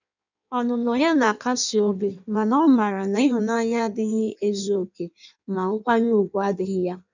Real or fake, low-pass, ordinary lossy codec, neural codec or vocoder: fake; 7.2 kHz; none; codec, 16 kHz in and 24 kHz out, 1.1 kbps, FireRedTTS-2 codec